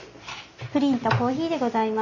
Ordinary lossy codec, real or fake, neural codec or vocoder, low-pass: none; real; none; 7.2 kHz